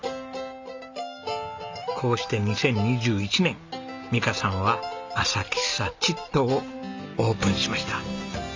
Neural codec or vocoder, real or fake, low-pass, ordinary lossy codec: none; real; 7.2 kHz; MP3, 64 kbps